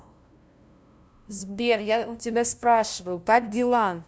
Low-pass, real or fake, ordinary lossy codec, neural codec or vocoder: none; fake; none; codec, 16 kHz, 0.5 kbps, FunCodec, trained on LibriTTS, 25 frames a second